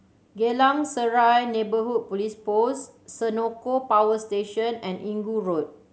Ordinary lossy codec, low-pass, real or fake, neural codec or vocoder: none; none; real; none